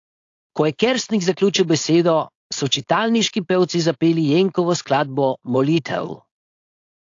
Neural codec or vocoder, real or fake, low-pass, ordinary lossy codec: codec, 16 kHz, 4.8 kbps, FACodec; fake; 7.2 kHz; AAC, 48 kbps